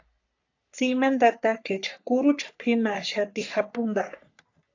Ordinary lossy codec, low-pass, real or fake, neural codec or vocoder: AAC, 48 kbps; 7.2 kHz; fake; codec, 44.1 kHz, 3.4 kbps, Pupu-Codec